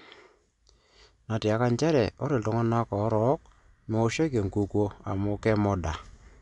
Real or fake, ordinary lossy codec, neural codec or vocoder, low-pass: real; none; none; 10.8 kHz